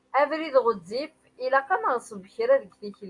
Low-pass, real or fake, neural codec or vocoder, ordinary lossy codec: 10.8 kHz; real; none; AAC, 48 kbps